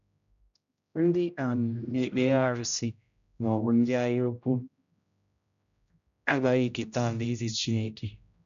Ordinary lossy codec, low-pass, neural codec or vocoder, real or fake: none; 7.2 kHz; codec, 16 kHz, 0.5 kbps, X-Codec, HuBERT features, trained on general audio; fake